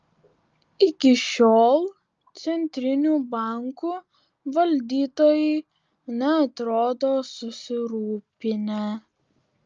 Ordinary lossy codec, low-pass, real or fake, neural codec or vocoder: Opus, 24 kbps; 7.2 kHz; real; none